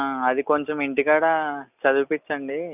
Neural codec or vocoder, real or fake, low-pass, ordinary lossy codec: none; real; 3.6 kHz; none